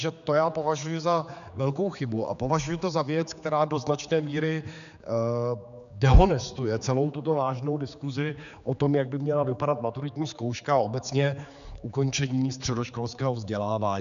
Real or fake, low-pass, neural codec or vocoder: fake; 7.2 kHz; codec, 16 kHz, 4 kbps, X-Codec, HuBERT features, trained on general audio